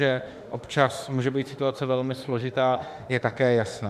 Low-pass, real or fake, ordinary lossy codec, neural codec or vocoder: 14.4 kHz; fake; Opus, 64 kbps; autoencoder, 48 kHz, 32 numbers a frame, DAC-VAE, trained on Japanese speech